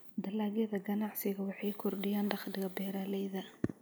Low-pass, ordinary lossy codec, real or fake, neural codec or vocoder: none; none; real; none